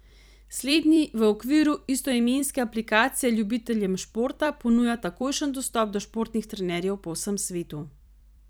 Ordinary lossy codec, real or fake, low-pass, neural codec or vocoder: none; fake; none; vocoder, 44.1 kHz, 128 mel bands every 256 samples, BigVGAN v2